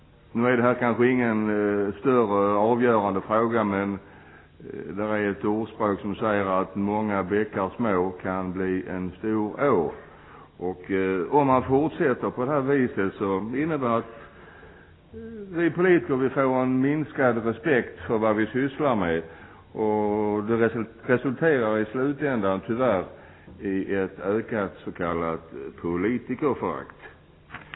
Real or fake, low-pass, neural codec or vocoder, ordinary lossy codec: real; 7.2 kHz; none; AAC, 16 kbps